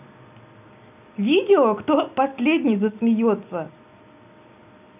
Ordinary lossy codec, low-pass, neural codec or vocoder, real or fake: none; 3.6 kHz; none; real